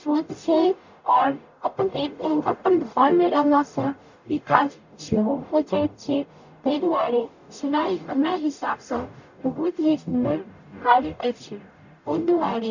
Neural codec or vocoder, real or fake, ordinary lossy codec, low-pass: codec, 44.1 kHz, 0.9 kbps, DAC; fake; none; 7.2 kHz